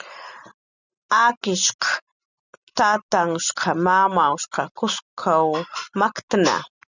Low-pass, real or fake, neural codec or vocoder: 7.2 kHz; real; none